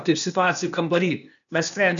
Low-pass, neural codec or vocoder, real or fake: 7.2 kHz; codec, 16 kHz, 0.8 kbps, ZipCodec; fake